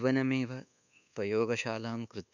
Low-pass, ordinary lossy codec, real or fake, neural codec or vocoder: 7.2 kHz; none; fake; codec, 24 kHz, 1.2 kbps, DualCodec